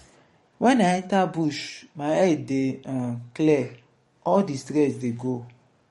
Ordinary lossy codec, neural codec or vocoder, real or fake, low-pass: MP3, 48 kbps; codec, 44.1 kHz, 7.8 kbps, DAC; fake; 19.8 kHz